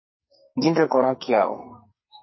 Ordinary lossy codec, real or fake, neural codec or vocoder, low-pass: MP3, 24 kbps; fake; codec, 44.1 kHz, 2.6 kbps, SNAC; 7.2 kHz